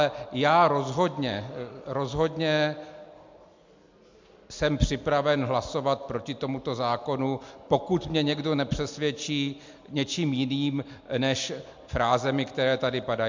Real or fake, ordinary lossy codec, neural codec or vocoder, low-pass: real; MP3, 64 kbps; none; 7.2 kHz